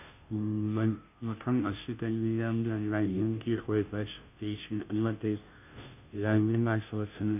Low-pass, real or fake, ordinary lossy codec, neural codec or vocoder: 3.6 kHz; fake; none; codec, 16 kHz, 0.5 kbps, FunCodec, trained on Chinese and English, 25 frames a second